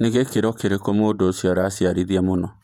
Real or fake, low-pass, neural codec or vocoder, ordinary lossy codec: fake; 19.8 kHz; vocoder, 48 kHz, 128 mel bands, Vocos; none